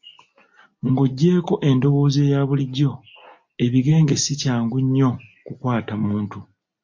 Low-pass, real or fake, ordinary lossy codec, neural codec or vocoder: 7.2 kHz; real; MP3, 48 kbps; none